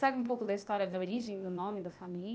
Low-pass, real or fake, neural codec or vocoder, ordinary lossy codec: none; fake; codec, 16 kHz, 0.8 kbps, ZipCodec; none